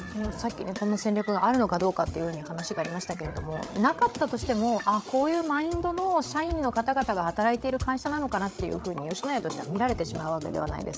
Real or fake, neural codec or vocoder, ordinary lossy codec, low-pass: fake; codec, 16 kHz, 8 kbps, FreqCodec, larger model; none; none